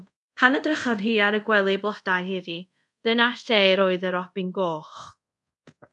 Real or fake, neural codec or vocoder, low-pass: fake; codec, 24 kHz, 0.5 kbps, DualCodec; 10.8 kHz